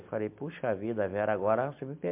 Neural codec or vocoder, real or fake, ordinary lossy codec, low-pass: none; real; MP3, 32 kbps; 3.6 kHz